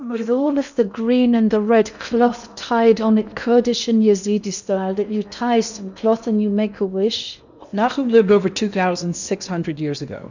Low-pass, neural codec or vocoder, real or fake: 7.2 kHz; codec, 16 kHz in and 24 kHz out, 0.8 kbps, FocalCodec, streaming, 65536 codes; fake